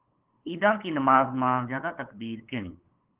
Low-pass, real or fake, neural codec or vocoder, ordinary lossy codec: 3.6 kHz; fake; codec, 16 kHz, 8 kbps, FunCodec, trained on LibriTTS, 25 frames a second; Opus, 16 kbps